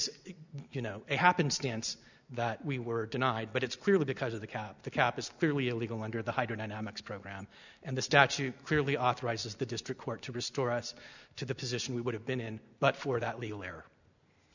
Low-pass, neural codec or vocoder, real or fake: 7.2 kHz; none; real